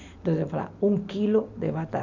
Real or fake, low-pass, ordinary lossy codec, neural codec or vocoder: real; 7.2 kHz; none; none